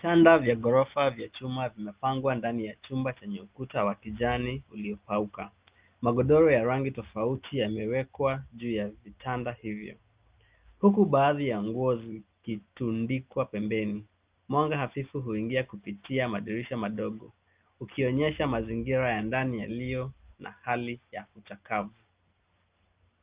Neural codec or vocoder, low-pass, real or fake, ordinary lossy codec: none; 3.6 kHz; real; Opus, 24 kbps